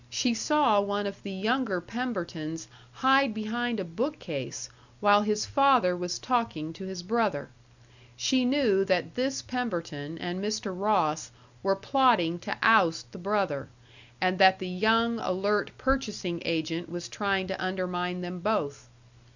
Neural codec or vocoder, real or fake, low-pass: none; real; 7.2 kHz